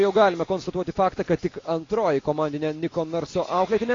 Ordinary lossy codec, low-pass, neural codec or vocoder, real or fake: AAC, 32 kbps; 7.2 kHz; none; real